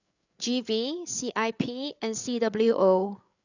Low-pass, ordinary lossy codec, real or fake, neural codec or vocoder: 7.2 kHz; none; fake; codec, 16 kHz, 8 kbps, FreqCodec, larger model